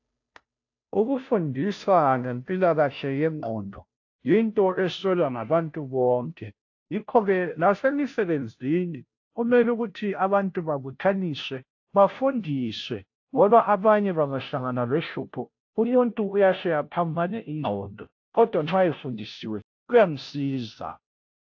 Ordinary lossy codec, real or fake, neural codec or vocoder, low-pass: AAC, 48 kbps; fake; codec, 16 kHz, 0.5 kbps, FunCodec, trained on Chinese and English, 25 frames a second; 7.2 kHz